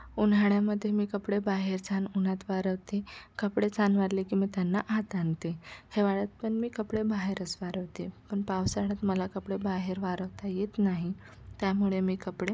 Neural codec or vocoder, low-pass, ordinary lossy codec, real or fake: none; none; none; real